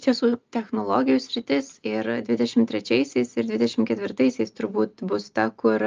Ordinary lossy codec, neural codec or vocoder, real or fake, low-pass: Opus, 24 kbps; none; real; 7.2 kHz